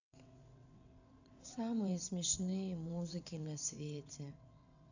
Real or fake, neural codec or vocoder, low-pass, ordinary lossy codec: fake; vocoder, 22.05 kHz, 80 mel bands, WaveNeXt; 7.2 kHz; none